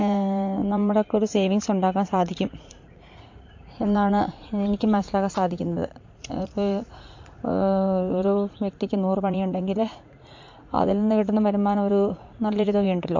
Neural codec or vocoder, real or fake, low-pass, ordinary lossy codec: vocoder, 44.1 kHz, 80 mel bands, Vocos; fake; 7.2 kHz; MP3, 48 kbps